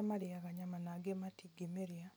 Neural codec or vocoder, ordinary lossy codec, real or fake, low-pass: none; none; real; none